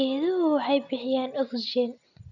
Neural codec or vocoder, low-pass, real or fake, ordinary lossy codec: none; 7.2 kHz; real; none